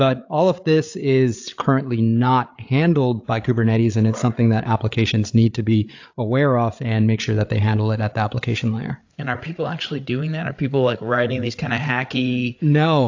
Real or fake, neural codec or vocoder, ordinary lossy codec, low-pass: fake; codec, 16 kHz, 8 kbps, FreqCodec, larger model; AAC, 48 kbps; 7.2 kHz